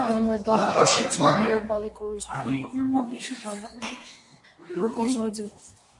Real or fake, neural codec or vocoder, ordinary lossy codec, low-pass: fake; codec, 24 kHz, 1 kbps, SNAC; MP3, 48 kbps; 10.8 kHz